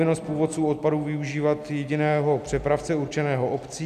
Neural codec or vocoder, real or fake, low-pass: none; real; 14.4 kHz